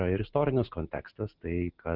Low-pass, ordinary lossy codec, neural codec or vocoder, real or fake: 5.4 kHz; Opus, 24 kbps; none; real